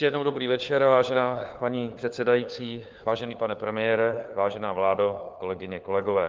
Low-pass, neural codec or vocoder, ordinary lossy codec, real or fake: 7.2 kHz; codec, 16 kHz, 4 kbps, FunCodec, trained on LibriTTS, 50 frames a second; Opus, 24 kbps; fake